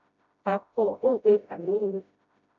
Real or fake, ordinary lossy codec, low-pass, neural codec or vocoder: fake; AAC, 64 kbps; 7.2 kHz; codec, 16 kHz, 0.5 kbps, FreqCodec, smaller model